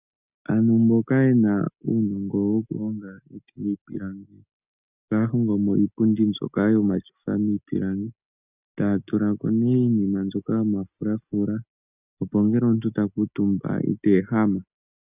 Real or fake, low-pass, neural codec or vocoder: real; 3.6 kHz; none